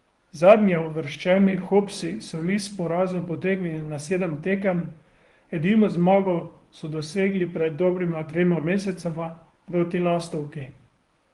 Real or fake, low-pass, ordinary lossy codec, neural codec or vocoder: fake; 10.8 kHz; Opus, 24 kbps; codec, 24 kHz, 0.9 kbps, WavTokenizer, medium speech release version 1